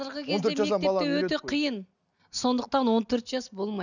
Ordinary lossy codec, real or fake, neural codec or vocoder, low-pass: none; real; none; 7.2 kHz